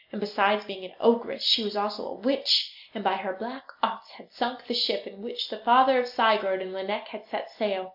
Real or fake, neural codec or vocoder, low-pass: real; none; 5.4 kHz